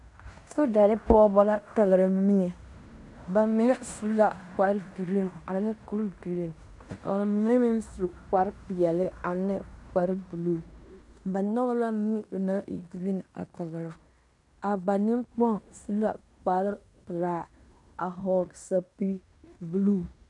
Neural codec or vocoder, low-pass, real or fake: codec, 16 kHz in and 24 kHz out, 0.9 kbps, LongCat-Audio-Codec, fine tuned four codebook decoder; 10.8 kHz; fake